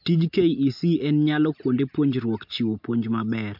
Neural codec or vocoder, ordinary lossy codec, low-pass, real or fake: none; MP3, 48 kbps; 5.4 kHz; real